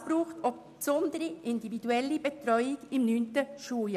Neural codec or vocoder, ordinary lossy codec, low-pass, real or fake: none; none; 14.4 kHz; real